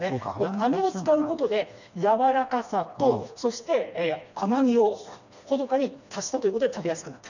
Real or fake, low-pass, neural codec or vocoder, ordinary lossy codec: fake; 7.2 kHz; codec, 16 kHz, 2 kbps, FreqCodec, smaller model; none